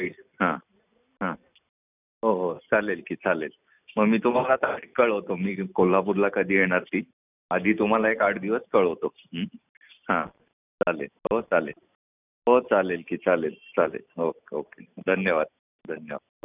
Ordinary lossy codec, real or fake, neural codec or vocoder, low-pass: none; real; none; 3.6 kHz